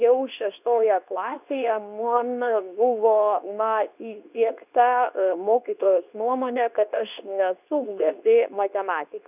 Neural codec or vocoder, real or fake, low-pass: codec, 24 kHz, 0.9 kbps, WavTokenizer, medium speech release version 2; fake; 3.6 kHz